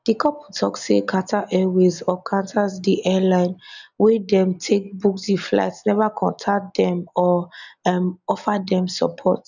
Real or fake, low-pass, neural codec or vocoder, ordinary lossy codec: real; 7.2 kHz; none; none